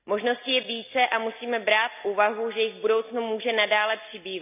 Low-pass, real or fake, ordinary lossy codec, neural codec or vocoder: 3.6 kHz; real; none; none